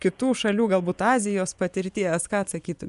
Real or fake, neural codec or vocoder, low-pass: fake; vocoder, 24 kHz, 100 mel bands, Vocos; 10.8 kHz